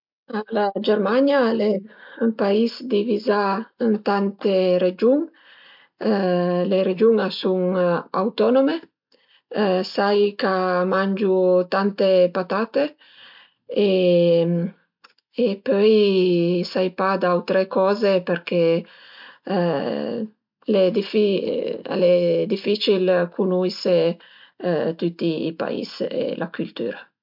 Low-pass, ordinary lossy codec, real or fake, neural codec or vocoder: 5.4 kHz; MP3, 48 kbps; real; none